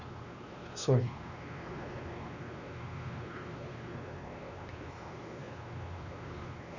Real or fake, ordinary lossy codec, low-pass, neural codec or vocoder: fake; Opus, 64 kbps; 7.2 kHz; codec, 16 kHz, 2 kbps, X-Codec, WavLM features, trained on Multilingual LibriSpeech